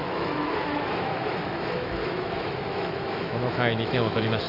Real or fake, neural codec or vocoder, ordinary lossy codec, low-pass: fake; autoencoder, 48 kHz, 128 numbers a frame, DAC-VAE, trained on Japanese speech; none; 5.4 kHz